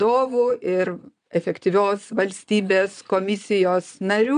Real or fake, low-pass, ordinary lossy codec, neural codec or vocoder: fake; 9.9 kHz; MP3, 96 kbps; vocoder, 22.05 kHz, 80 mel bands, Vocos